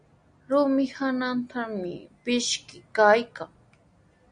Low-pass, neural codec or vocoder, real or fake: 9.9 kHz; none; real